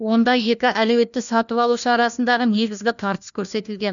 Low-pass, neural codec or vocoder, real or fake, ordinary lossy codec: 7.2 kHz; codec, 16 kHz, 1 kbps, FunCodec, trained on LibriTTS, 50 frames a second; fake; none